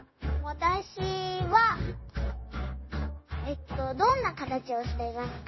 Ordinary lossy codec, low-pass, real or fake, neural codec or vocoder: MP3, 24 kbps; 7.2 kHz; fake; codec, 16 kHz, 0.9 kbps, LongCat-Audio-Codec